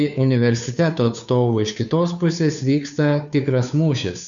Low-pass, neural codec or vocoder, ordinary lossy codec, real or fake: 7.2 kHz; codec, 16 kHz, 2 kbps, FunCodec, trained on Chinese and English, 25 frames a second; MP3, 96 kbps; fake